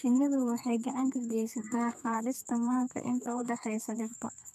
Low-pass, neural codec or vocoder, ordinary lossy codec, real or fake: 14.4 kHz; codec, 32 kHz, 1.9 kbps, SNAC; none; fake